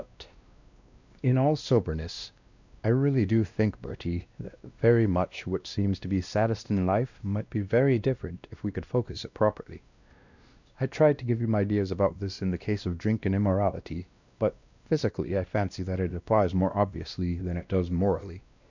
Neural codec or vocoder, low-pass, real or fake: codec, 16 kHz, 1 kbps, X-Codec, WavLM features, trained on Multilingual LibriSpeech; 7.2 kHz; fake